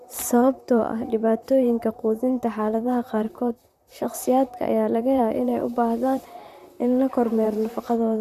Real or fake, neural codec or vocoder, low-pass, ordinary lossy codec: fake; vocoder, 44.1 kHz, 128 mel bands, Pupu-Vocoder; 14.4 kHz; none